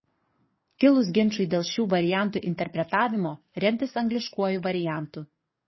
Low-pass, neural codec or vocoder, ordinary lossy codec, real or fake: 7.2 kHz; codec, 44.1 kHz, 7.8 kbps, DAC; MP3, 24 kbps; fake